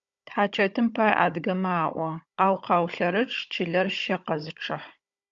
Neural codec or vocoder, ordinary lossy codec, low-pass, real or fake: codec, 16 kHz, 16 kbps, FunCodec, trained on Chinese and English, 50 frames a second; Opus, 64 kbps; 7.2 kHz; fake